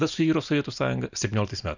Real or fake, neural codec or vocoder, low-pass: real; none; 7.2 kHz